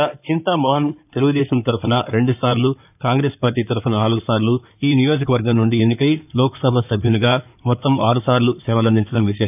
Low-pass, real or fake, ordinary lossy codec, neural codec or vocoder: 3.6 kHz; fake; none; codec, 16 kHz in and 24 kHz out, 2.2 kbps, FireRedTTS-2 codec